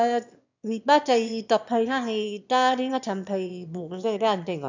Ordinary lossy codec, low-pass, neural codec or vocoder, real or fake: none; 7.2 kHz; autoencoder, 22.05 kHz, a latent of 192 numbers a frame, VITS, trained on one speaker; fake